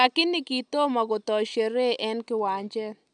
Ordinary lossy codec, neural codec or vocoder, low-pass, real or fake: none; none; 10.8 kHz; real